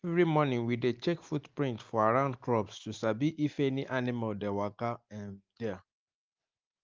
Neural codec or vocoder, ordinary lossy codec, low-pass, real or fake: none; Opus, 32 kbps; 7.2 kHz; real